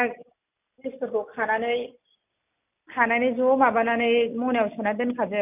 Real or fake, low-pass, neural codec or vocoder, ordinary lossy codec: real; 3.6 kHz; none; none